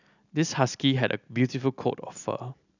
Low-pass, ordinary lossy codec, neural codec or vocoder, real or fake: 7.2 kHz; none; none; real